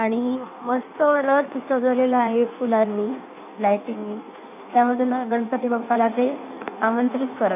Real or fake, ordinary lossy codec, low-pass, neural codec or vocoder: fake; none; 3.6 kHz; codec, 16 kHz in and 24 kHz out, 1.1 kbps, FireRedTTS-2 codec